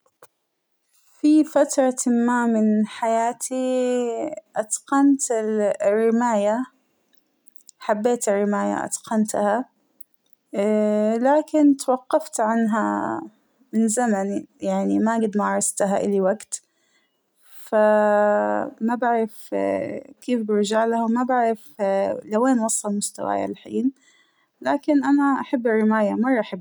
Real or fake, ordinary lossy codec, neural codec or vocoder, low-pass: real; none; none; none